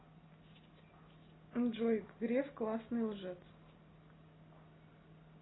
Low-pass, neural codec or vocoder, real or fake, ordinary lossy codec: 7.2 kHz; none; real; AAC, 16 kbps